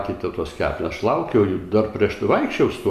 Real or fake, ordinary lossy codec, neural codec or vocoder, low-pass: real; Opus, 64 kbps; none; 14.4 kHz